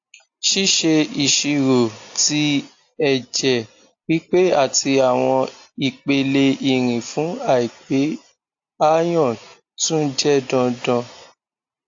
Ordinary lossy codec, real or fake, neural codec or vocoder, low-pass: AAC, 48 kbps; real; none; 7.2 kHz